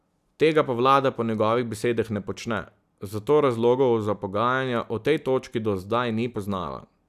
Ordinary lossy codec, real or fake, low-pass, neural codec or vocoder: none; real; 14.4 kHz; none